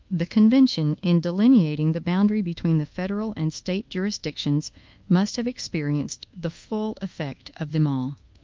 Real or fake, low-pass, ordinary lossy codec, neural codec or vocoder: fake; 7.2 kHz; Opus, 32 kbps; codec, 24 kHz, 1.2 kbps, DualCodec